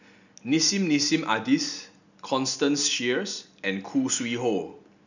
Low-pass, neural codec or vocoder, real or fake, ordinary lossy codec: 7.2 kHz; none; real; none